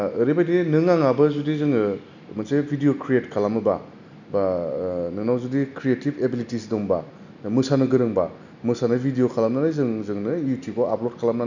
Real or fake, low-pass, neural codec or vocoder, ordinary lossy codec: real; 7.2 kHz; none; none